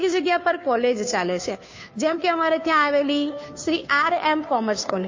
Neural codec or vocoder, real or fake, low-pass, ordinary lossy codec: codec, 16 kHz, 2 kbps, FunCodec, trained on Chinese and English, 25 frames a second; fake; 7.2 kHz; MP3, 32 kbps